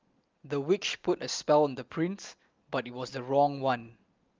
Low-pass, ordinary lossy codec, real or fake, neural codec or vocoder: 7.2 kHz; Opus, 24 kbps; real; none